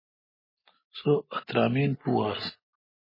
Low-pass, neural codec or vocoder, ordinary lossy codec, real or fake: 5.4 kHz; none; MP3, 24 kbps; real